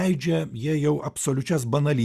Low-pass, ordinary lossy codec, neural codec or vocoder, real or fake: 14.4 kHz; Opus, 64 kbps; none; real